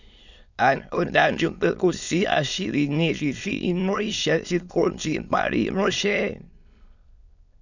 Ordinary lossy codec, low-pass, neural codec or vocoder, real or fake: none; 7.2 kHz; autoencoder, 22.05 kHz, a latent of 192 numbers a frame, VITS, trained on many speakers; fake